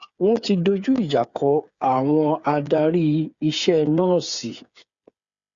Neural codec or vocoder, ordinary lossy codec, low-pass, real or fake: codec, 16 kHz, 8 kbps, FreqCodec, smaller model; Opus, 64 kbps; 7.2 kHz; fake